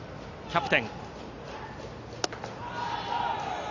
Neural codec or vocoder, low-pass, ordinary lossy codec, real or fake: none; 7.2 kHz; none; real